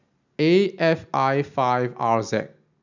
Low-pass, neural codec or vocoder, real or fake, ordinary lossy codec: 7.2 kHz; none; real; none